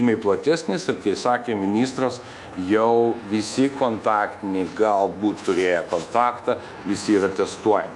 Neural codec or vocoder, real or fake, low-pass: codec, 24 kHz, 1.2 kbps, DualCodec; fake; 10.8 kHz